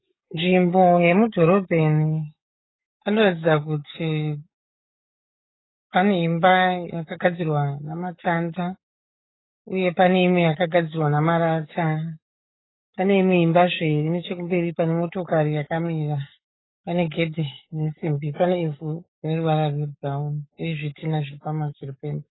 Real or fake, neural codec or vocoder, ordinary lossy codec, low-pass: fake; codec, 44.1 kHz, 7.8 kbps, DAC; AAC, 16 kbps; 7.2 kHz